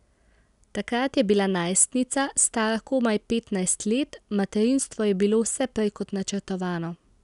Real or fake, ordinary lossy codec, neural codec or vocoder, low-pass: real; none; none; 10.8 kHz